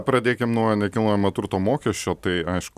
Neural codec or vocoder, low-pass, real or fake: none; 14.4 kHz; real